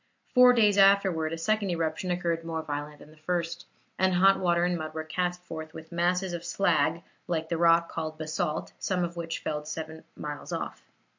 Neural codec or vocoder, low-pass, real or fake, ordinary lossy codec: none; 7.2 kHz; real; MP3, 48 kbps